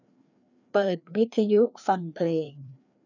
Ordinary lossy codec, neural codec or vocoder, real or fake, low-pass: none; codec, 16 kHz, 2 kbps, FreqCodec, larger model; fake; 7.2 kHz